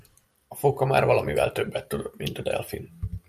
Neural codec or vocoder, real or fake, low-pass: none; real; 14.4 kHz